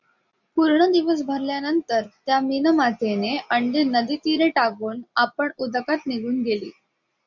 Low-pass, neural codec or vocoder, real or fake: 7.2 kHz; none; real